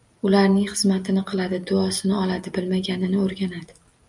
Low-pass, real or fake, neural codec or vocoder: 10.8 kHz; real; none